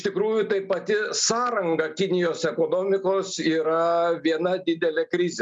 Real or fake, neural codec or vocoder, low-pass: real; none; 9.9 kHz